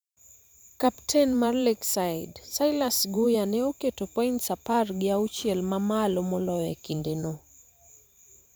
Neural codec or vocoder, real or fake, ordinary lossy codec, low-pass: vocoder, 44.1 kHz, 128 mel bands every 512 samples, BigVGAN v2; fake; none; none